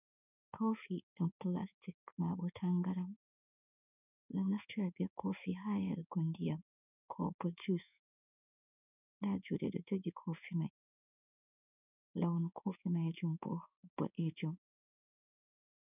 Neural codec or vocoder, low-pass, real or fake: codec, 16 kHz in and 24 kHz out, 1 kbps, XY-Tokenizer; 3.6 kHz; fake